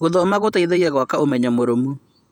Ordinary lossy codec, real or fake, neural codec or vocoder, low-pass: none; fake; vocoder, 48 kHz, 128 mel bands, Vocos; 19.8 kHz